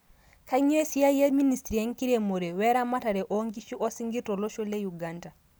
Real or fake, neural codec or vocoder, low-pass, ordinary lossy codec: real; none; none; none